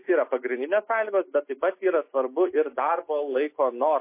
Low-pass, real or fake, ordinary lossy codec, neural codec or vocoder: 3.6 kHz; real; MP3, 24 kbps; none